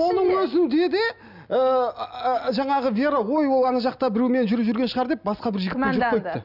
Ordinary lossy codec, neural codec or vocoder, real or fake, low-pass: none; none; real; 5.4 kHz